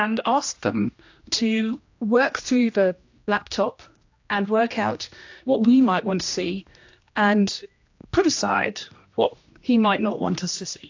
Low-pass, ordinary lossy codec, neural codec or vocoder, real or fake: 7.2 kHz; AAC, 48 kbps; codec, 16 kHz, 1 kbps, X-Codec, HuBERT features, trained on general audio; fake